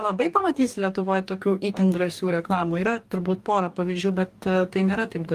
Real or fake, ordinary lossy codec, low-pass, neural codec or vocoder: fake; Opus, 32 kbps; 14.4 kHz; codec, 44.1 kHz, 2.6 kbps, DAC